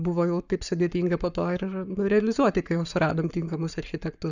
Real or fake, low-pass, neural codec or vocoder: fake; 7.2 kHz; codec, 44.1 kHz, 7.8 kbps, Pupu-Codec